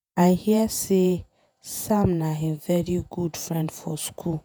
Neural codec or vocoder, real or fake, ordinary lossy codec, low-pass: vocoder, 48 kHz, 128 mel bands, Vocos; fake; none; none